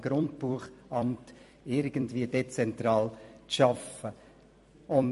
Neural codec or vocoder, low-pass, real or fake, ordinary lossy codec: vocoder, 44.1 kHz, 128 mel bands every 256 samples, BigVGAN v2; 14.4 kHz; fake; MP3, 48 kbps